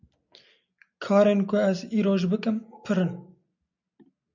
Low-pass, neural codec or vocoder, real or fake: 7.2 kHz; none; real